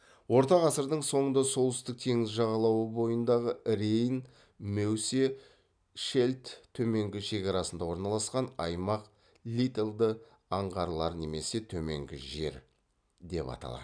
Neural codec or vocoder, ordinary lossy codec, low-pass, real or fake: none; none; 9.9 kHz; real